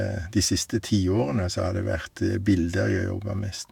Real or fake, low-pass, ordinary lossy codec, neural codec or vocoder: fake; 19.8 kHz; none; autoencoder, 48 kHz, 128 numbers a frame, DAC-VAE, trained on Japanese speech